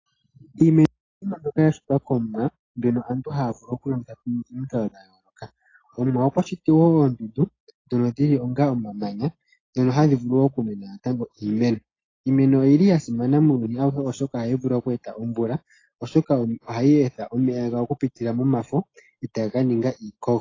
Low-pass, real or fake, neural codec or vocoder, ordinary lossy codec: 7.2 kHz; real; none; AAC, 32 kbps